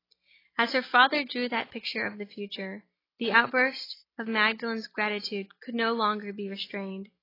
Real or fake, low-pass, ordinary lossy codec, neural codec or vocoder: real; 5.4 kHz; AAC, 24 kbps; none